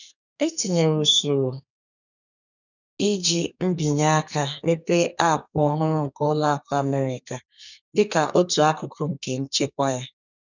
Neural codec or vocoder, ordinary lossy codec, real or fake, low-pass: codec, 32 kHz, 1.9 kbps, SNAC; none; fake; 7.2 kHz